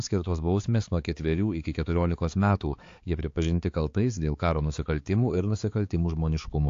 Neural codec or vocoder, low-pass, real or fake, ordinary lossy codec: codec, 16 kHz, 4 kbps, X-Codec, HuBERT features, trained on balanced general audio; 7.2 kHz; fake; AAC, 48 kbps